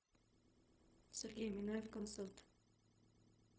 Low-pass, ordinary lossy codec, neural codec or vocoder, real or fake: none; none; codec, 16 kHz, 0.4 kbps, LongCat-Audio-Codec; fake